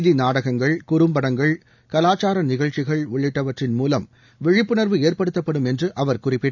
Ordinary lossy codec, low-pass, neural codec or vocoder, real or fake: none; 7.2 kHz; none; real